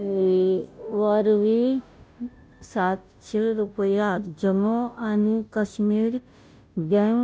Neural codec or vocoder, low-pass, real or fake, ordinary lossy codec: codec, 16 kHz, 0.5 kbps, FunCodec, trained on Chinese and English, 25 frames a second; none; fake; none